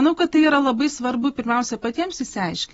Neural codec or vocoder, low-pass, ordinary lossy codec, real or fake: none; 19.8 kHz; AAC, 24 kbps; real